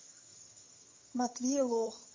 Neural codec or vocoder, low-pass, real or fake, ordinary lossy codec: vocoder, 22.05 kHz, 80 mel bands, HiFi-GAN; 7.2 kHz; fake; MP3, 32 kbps